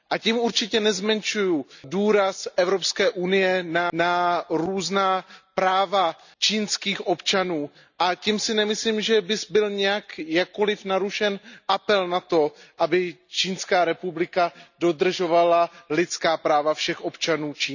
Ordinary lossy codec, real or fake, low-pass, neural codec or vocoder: none; real; 7.2 kHz; none